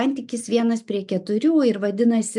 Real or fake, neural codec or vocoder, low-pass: real; none; 10.8 kHz